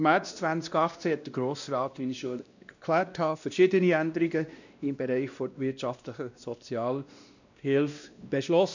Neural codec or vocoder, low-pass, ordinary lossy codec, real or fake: codec, 16 kHz, 1 kbps, X-Codec, WavLM features, trained on Multilingual LibriSpeech; 7.2 kHz; none; fake